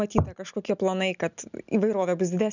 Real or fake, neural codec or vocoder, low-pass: real; none; 7.2 kHz